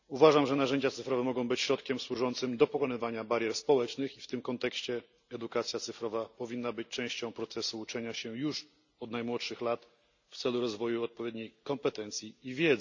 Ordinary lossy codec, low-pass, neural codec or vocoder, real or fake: none; 7.2 kHz; none; real